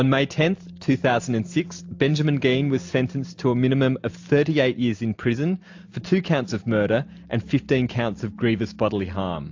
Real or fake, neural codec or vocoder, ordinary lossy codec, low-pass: real; none; AAC, 48 kbps; 7.2 kHz